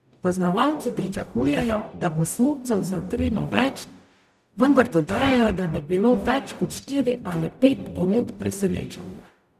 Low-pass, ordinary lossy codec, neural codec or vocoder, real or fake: 14.4 kHz; none; codec, 44.1 kHz, 0.9 kbps, DAC; fake